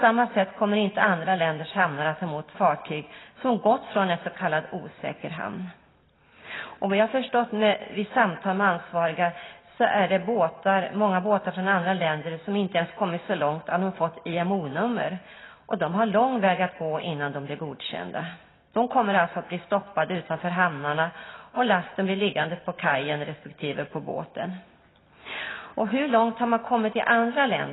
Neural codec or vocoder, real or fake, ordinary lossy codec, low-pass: none; real; AAC, 16 kbps; 7.2 kHz